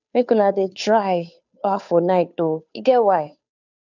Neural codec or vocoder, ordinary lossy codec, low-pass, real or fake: codec, 16 kHz, 2 kbps, FunCodec, trained on Chinese and English, 25 frames a second; none; 7.2 kHz; fake